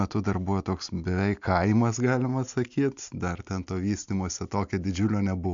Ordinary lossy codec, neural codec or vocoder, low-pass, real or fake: MP3, 64 kbps; none; 7.2 kHz; real